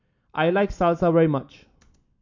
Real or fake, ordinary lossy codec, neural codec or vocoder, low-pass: real; MP3, 48 kbps; none; 7.2 kHz